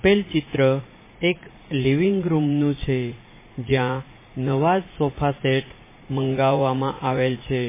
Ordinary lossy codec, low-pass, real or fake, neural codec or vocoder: MP3, 16 kbps; 3.6 kHz; fake; vocoder, 44.1 kHz, 80 mel bands, Vocos